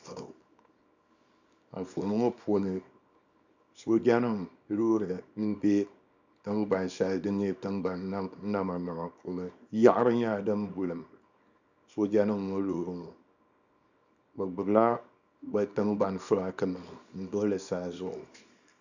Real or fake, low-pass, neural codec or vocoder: fake; 7.2 kHz; codec, 24 kHz, 0.9 kbps, WavTokenizer, small release